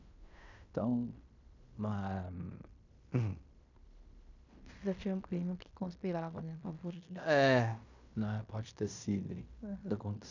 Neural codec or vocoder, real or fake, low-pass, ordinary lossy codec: codec, 16 kHz in and 24 kHz out, 0.9 kbps, LongCat-Audio-Codec, fine tuned four codebook decoder; fake; 7.2 kHz; none